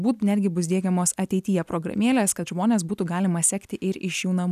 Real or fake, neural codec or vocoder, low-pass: real; none; 14.4 kHz